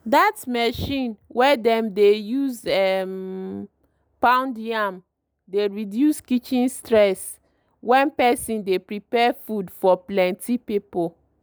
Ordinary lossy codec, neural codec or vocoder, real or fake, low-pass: none; none; real; none